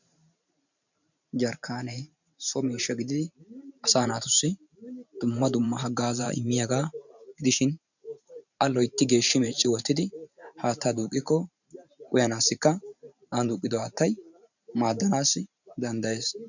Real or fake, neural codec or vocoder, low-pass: fake; vocoder, 44.1 kHz, 128 mel bands every 512 samples, BigVGAN v2; 7.2 kHz